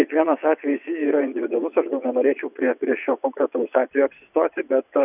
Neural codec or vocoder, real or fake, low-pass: vocoder, 22.05 kHz, 80 mel bands, WaveNeXt; fake; 3.6 kHz